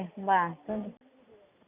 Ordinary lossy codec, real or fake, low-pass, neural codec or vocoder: none; real; 3.6 kHz; none